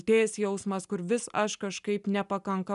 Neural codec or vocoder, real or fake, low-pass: none; real; 10.8 kHz